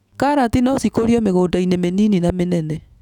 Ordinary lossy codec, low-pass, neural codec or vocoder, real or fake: none; 19.8 kHz; autoencoder, 48 kHz, 128 numbers a frame, DAC-VAE, trained on Japanese speech; fake